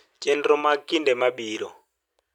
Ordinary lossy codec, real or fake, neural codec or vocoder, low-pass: none; real; none; 19.8 kHz